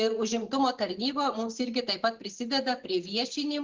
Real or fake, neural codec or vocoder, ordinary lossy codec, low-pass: real; none; Opus, 16 kbps; 7.2 kHz